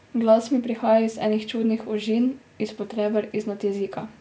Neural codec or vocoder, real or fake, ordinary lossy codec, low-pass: none; real; none; none